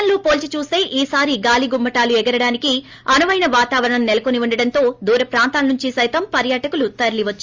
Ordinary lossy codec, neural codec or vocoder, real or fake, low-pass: Opus, 32 kbps; none; real; 7.2 kHz